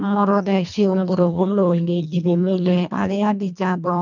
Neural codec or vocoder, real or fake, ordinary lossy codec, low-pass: codec, 24 kHz, 1.5 kbps, HILCodec; fake; none; 7.2 kHz